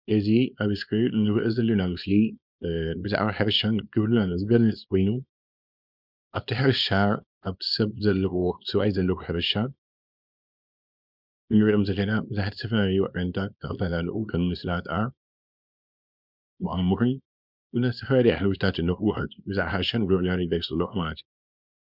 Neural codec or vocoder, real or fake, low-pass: codec, 24 kHz, 0.9 kbps, WavTokenizer, small release; fake; 5.4 kHz